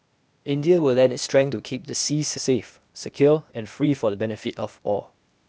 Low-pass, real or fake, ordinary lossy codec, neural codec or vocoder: none; fake; none; codec, 16 kHz, 0.8 kbps, ZipCodec